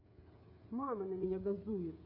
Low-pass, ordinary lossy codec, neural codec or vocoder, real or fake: 5.4 kHz; AAC, 48 kbps; codec, 16 kHz, 16 kbps, FreqCodec, smaller model; fake